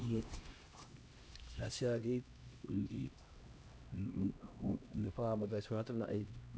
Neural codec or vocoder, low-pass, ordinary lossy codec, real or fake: codec, 16 kHz, 1 kbps, X-Codec, HuBERT features, trained on LibriSpeech; none; none; fake